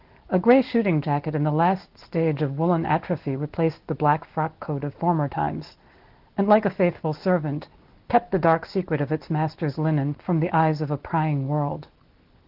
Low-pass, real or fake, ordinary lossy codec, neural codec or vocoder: 5.4 kHz; real; Opus, 16 kbps; none